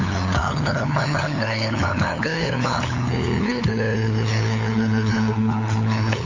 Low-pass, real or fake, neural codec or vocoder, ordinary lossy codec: 7.2 kHz; fake; codec, 16 kHz, 8 kbps, FunCodec, trained on LibriTTS, 25 frames a second; AAC, 32 kbps